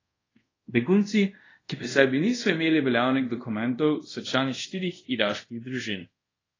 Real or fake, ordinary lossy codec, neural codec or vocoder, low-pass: fake; AAC, 32 kbps; codec, 24 kHz, 0.5 kbps, DualCodec; 7.2 kHz